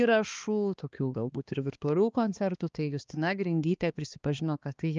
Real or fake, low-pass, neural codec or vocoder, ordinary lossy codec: fake; 7.2 kHz; codec, 16 kHz, 2 kbps, X-Codec, HuBERT features, trained on balanced general audio; Opus, 24 kbps